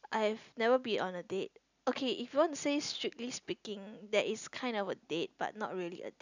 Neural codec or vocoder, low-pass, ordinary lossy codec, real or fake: none; 7.2 kHz; none; real